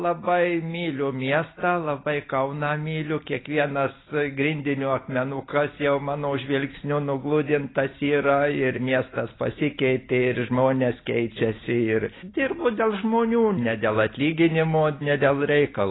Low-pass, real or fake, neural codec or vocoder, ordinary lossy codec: 7.2 kHz; real; none; AAC, 16 kbps